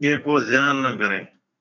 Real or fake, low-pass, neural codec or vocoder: fake; 7.2 kHz; codec, 32 kHz, 1.9 kbps, SNAC